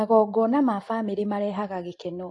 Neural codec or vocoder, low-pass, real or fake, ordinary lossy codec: none; 10.8 kHz; real; AAC, 48 kbps